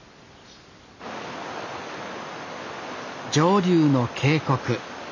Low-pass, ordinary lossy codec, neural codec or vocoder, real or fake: 7.2 kHz; none; none; real